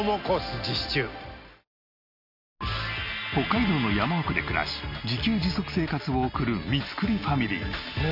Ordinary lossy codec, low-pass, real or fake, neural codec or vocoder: none; 5.4 kHz; real; none